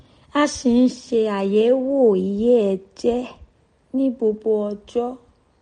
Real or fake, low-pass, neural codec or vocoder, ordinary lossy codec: real; 9.9 kHz; none; MP3, 48 kbps